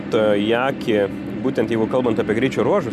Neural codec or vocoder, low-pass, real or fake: none; 14.4 kHz; real